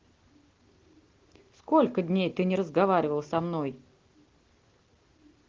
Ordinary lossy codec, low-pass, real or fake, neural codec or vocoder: Opus, 16 kbps; 7.2 kHz; real; none